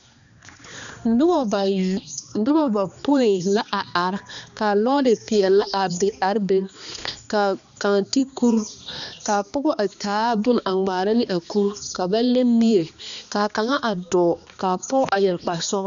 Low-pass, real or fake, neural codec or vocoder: 7.2 kHz; fake; codec, 16 kHz, 2 kbps, X-Codec, HuBERT features, trained on balanced general audio